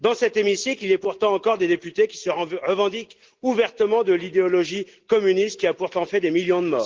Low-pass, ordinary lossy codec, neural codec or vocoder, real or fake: 7.2 kHz; Opus, 16 kbps; none; real